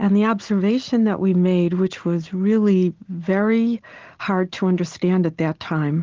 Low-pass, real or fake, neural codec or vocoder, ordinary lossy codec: 7.2 kHz; real; none; Opus, 16 kbps